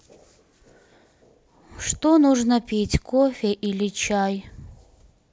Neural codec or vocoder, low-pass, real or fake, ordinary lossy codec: none; none; real; none